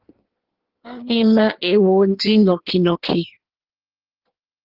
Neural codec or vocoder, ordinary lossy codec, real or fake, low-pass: codec, 16 kHz in and 24 kHz out, 1.1 kbps, FireRedTTS-2 codec; Opus, 16 kbps; fake; 5.4 kHz